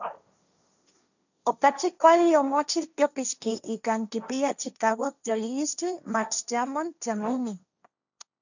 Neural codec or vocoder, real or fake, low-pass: codec, 16 kHz, 1.1 kbps, Voila-Tokenizer; fake; 7.2 kHz